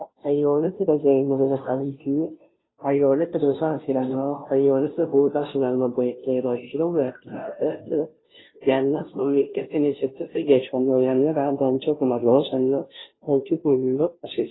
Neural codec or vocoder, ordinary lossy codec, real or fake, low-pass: codec, 16 kHz, 0.5 kbps, FunCodec, trained on Chinese and English, 25 frames a second; AAC, 16 kbps; fake; 7.2 kHz